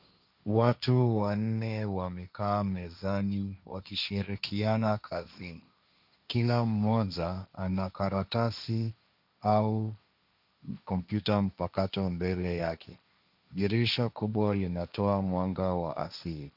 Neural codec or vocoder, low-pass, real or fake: codec, 16 kHz, 1.1 kbps, Voila-Tokenizer; 5.4 kHz; fake